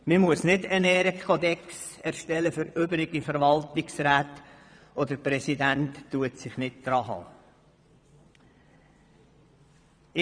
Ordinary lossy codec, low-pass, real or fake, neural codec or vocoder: none; 9.9 kHz; fake; vocoder, 22.05 kHz, 80 mel bands, Vocos